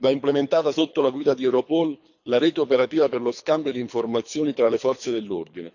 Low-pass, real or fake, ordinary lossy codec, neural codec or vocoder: 7.2 kHz; fake; none; codec, 24 kHz, 3 kbps, HILCodec